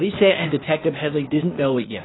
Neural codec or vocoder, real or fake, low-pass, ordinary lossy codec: codec, 16 kHz, 0.8 kbps, ZipCodec; fake; 7.2 kHz; AAC, 16 kbps